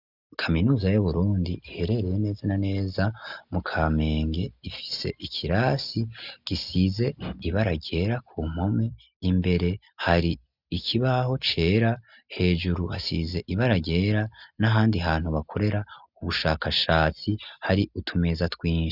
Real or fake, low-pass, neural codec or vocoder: real; 5.4 kHz; none